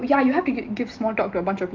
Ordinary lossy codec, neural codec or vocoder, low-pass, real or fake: Opus, 24 kbps; none; 7.2 kHz; real